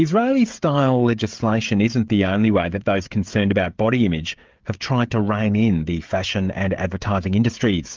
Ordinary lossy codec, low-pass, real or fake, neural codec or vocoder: Opus, 32 kbps; 7.2 kHz; fake; codec, 16 kHz, 16 kbps, FreqCodec, smaller model